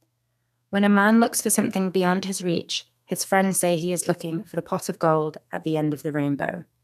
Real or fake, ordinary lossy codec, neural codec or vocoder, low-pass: fake; none; codec, 32 kHz, 1.9 kbps, SNAC; 14.4 kHz